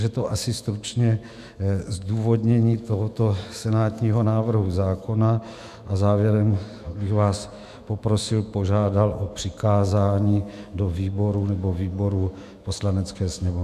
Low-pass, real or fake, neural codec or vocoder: 14.4 kHz; fake; autoencoder, 48 kHz, 128 numbers a frame, DAC-VAE, trained on Japanese speech